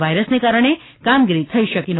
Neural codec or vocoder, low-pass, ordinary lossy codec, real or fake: none; 7.2 kHz; AAC, 16 kbps; real